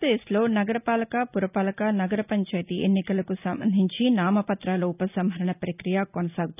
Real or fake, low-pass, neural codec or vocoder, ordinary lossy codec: real; 3.6 kHz; none; none